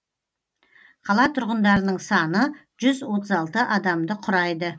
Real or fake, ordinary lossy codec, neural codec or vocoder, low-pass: real; none; none; none